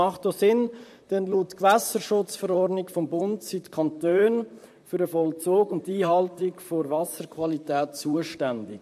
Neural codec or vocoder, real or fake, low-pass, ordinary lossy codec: vocoder, 44.1 kHz, 128 mel bands, Pupu-Vocoder; fake; 14.4 kHz; MP3, 64 kbps